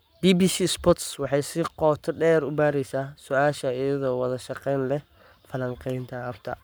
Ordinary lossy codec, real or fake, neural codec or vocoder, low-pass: none; fake; codec, 44.1 kHz, 7.8 kbps, Pupu-Codec; none